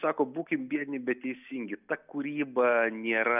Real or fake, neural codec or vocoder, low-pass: real; none; 3.6 kHz